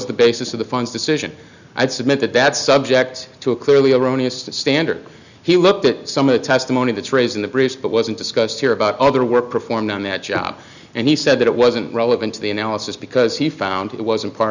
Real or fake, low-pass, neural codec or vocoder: real; 7.2 kHz; none